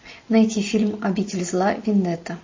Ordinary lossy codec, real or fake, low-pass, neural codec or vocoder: MP3, 32 kbps; real; 7.2 kHz; none